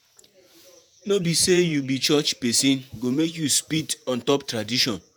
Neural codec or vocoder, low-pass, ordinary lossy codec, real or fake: vocoder, 48 kHz, 128 mel bands, Vocos; none; none; fake